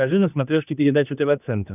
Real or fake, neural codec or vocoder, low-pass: fake; codec, 16 kHz, 2 kbps, X-Codec, HuBERT features, trained on general audio; 3.6 kHz